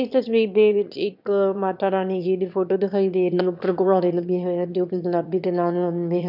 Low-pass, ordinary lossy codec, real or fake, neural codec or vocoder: 5.4 kHz; none; fake; autoencoder, 22.05 kHz, a latent of 192 numbers a frame, VITS, trained on one speaker